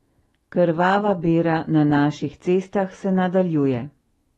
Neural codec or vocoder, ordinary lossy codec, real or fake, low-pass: autoencoder, 48 kHz, 128 numbers a frame, DAC-VAE, trained on Japanese speech; AAC, 32 kbps; fake; 19.8 kHz